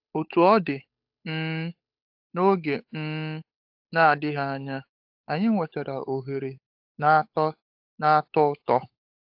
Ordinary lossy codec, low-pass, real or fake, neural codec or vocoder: AAC, 48 kbps; 5.4 kHz; fake; codec, 16 kHz, 8 kbps, FunCodec, trained on Chinese and English, 25 frames a second